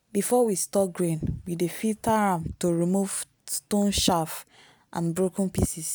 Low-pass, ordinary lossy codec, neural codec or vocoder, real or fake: none; none; none; real